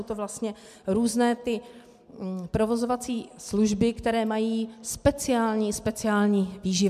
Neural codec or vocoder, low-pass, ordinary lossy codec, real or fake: none; 14.4 kHz; MP3, 96 kbps; real